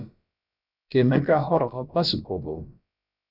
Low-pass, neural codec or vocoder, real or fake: 5.4 kHz; codec, 16 kHz, about 1 kbps, DyCAST, with the encoder's durations; fake